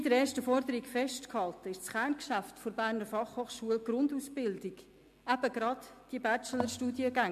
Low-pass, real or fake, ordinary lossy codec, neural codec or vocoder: 14.4 kHz; real; MP3, 64 kbps; none